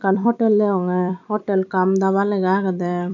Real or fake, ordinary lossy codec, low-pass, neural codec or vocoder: fake; none; 7.2 kHz; vocoder, 44.1 kHz, 128 mel bands every 256 samples, BigVGAN v2